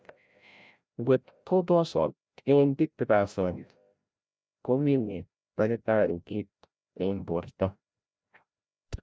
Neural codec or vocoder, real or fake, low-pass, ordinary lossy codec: codec, 16 kHz, 0.5 kbps, FreqCodec, larger model; fake; none; none